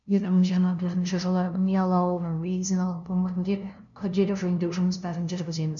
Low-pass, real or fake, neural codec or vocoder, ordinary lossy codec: 7.2 kHz; fake; codec, 16 kHz, 0.5 kbps, FunCodec, trained on LibriTTS, 25 frames a second; none